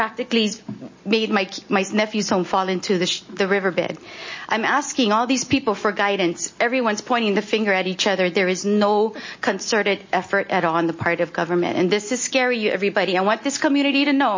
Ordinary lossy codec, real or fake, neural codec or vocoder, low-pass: MP3, 32 kbps; real; none; 7.2 kHz